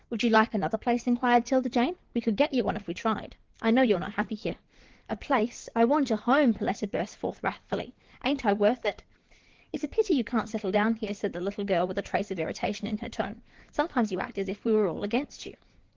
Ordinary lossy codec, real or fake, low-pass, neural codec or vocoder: Opus, 16 kbps; fake; 7.2 kHz; codec, 16 kHz in and 24 kHz out, 2.2 kbps, FireRedTTS-2 codec